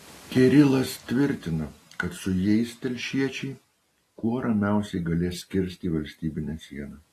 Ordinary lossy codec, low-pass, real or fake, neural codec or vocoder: AAC, 48 kbps; 14.4 kHz; real; none